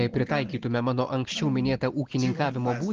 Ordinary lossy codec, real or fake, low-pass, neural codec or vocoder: Opus, 16 kbps; real; 7.2 kHz; none